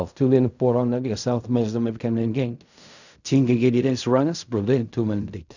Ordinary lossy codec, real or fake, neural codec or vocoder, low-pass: none; fake; codec, 16 kHz in and 24 kHz out, 0.4 kbps, LongCat-Audio-Codec, fine tuned four codebook decoder; 7.2 kHz